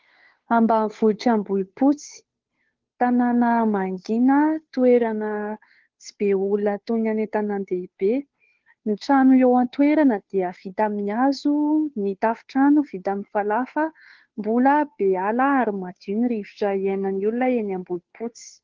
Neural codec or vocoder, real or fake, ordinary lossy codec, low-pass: codec, 16 kHz, 2 kbps, FunCodec, trained on Chinese and English, 25 frames a second; fake; Opus, 16 kbps; 7.2 kHz